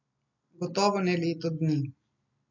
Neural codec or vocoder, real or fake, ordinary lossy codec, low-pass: none; real; none; 7.2 kHz